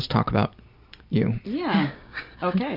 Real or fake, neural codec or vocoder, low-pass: real; none; 5.4 kHz